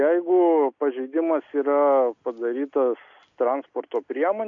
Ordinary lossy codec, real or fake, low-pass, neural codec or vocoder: AAC, 64 kbps; real; 7.2 kHz; none